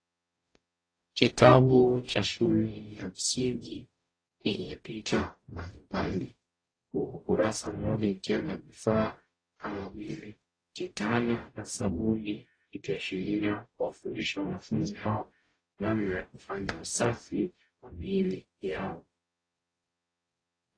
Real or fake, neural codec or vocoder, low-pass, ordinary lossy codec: fake; codec, 44.1 kHz, 0.9 kbps, DAC; 9.9 kHz; AAC, 32 kbps